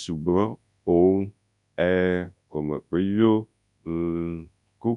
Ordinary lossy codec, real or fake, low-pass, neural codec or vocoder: none; fake; 10.8 kHz; codec, 24 kHz, 0.9 kbps, WavTokenizer, large speech release